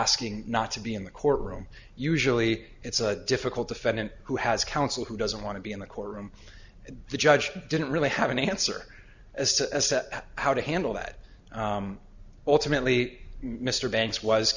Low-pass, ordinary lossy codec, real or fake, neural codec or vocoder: 7.2 kHz; Opus, 64 kbps; real; none